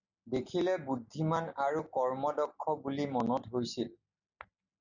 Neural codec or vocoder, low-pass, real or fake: none; 7.2 kHz; real